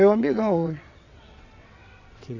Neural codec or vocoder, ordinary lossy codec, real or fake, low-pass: vocoder, 22.05 kHz, 80 mel bands, WaveNeXt; none; fake; 7.2 kHz